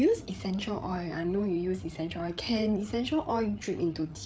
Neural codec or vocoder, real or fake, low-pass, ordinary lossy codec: codec, 16 kHz, 8 kbps, FreqCodec, larger model; fake; none; none